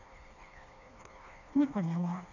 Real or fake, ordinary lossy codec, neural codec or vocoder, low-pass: fake; none; codec, 16 kHz, 2 kbps, FreqCodec, smaller model; 7.2 kHz